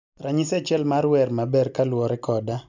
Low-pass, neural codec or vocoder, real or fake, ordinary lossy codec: 7.2 kHz; none; real; none